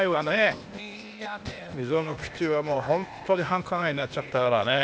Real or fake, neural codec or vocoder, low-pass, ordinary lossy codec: fake; codec, 16 kHz, 0.8 kbps, ZipCodec; none; none